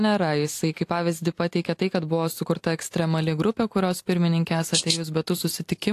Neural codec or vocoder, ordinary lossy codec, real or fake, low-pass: none; AAC, 64 kbps; real; 14.4 kHz